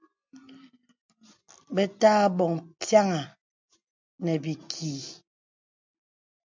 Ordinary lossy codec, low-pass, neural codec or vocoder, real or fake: MP3, 64 kbps; 7.2 kHz; none; real